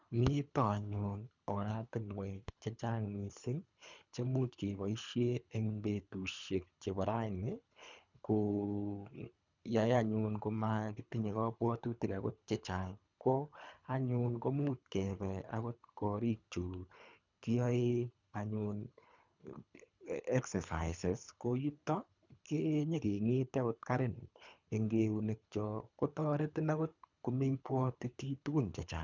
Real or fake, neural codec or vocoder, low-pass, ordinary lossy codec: fake; codec, 24 kHz, 3 kbps, HILCodec; 7.2 kHz; none